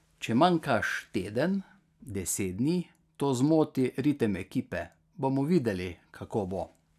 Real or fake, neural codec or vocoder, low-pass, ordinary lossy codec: real; none; 14.4 kHz; none